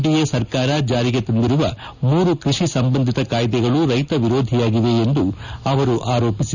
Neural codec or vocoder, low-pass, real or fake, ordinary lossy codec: none; 7.2 kHz; real; none